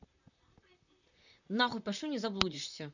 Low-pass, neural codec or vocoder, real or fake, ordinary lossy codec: 7.2 kHz; vocoder, 44.1 kHz, 128 mel bands, Pupu-Vocoder; fake; none